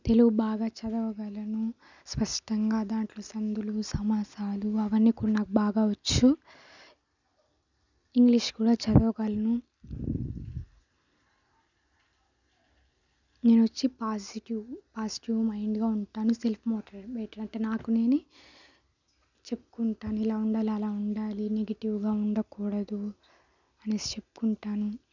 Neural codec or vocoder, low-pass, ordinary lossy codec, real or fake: none; 7.2 kHz; none; real